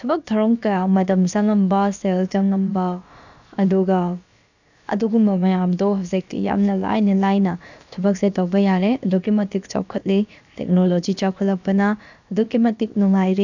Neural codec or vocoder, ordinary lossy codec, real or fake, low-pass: codec, 16 kHz, about 1 kbps, DyCAST, with the encoder's durations; none; fake; 7.2 kHz